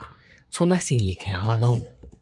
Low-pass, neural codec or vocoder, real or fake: 10.8 kHz; codec, 24 kHz, 1 kbps, SNAC; fake